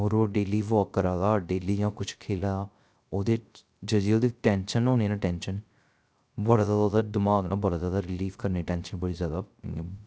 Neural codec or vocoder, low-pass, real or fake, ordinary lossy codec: codec, 16 kHz, 0.3 kbps, FocalCodec; none; fake; none